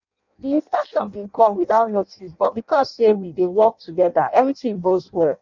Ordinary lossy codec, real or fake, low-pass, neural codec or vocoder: none; fake; 7.2 kHz; codec, 16 kHz in and 24 kHz out, 0.6 kbps, FireRedTTS-2 codec